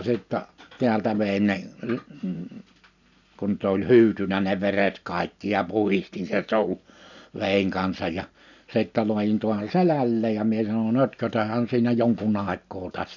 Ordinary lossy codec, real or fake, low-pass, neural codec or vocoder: none; real; 7.2 kHz; none